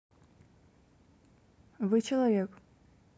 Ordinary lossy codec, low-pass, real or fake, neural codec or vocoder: none; none; real; none